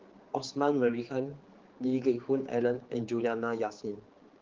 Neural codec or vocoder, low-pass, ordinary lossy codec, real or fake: codec, 16 kHz, 4 kbps, X-Codec, HuBERT features, trained on general audio; 7.2 kHz; Opus, 16 kbps; fake